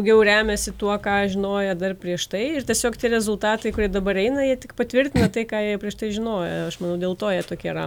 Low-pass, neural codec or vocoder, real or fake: 19.8 kHz; none; real